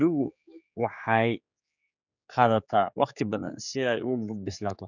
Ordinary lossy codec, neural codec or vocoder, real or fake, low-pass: none; codec, 16 kHz, 4 kbps, X-Codec, HuBERT features, trained on general audio; fake; 7.2 kHz